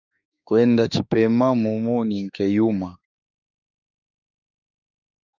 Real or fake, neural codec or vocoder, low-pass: fake; autoencoder, 48 kHz, 32 numbers a frame, DAC-VAE, trained on Japanese speech; 7.2 kHz